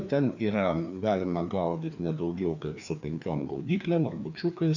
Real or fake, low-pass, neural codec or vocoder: fake; 7.2 kHz; codec, 16 kHz, 2 kbps, FreqCodec, larger model